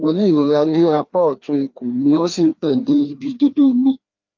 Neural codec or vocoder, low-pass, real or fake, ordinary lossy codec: codec, 16 kHz, 2 kbps, FreqCodec, larger model; 7.2 kHz; fake; Opus, 24 kbps